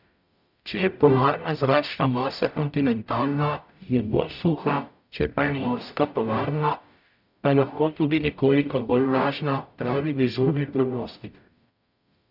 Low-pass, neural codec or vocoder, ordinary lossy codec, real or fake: 5.4 kHz; codec, 44.1 kHz, 0.9 kbps, DAC; none; fake